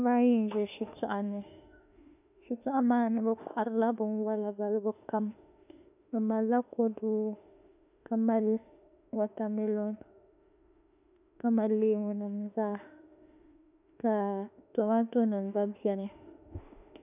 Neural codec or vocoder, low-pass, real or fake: autoencoder, 48 kHz, 32 numbers a frame, DAC-VAE, trained on Japanese speech; 3.6 kHz; fake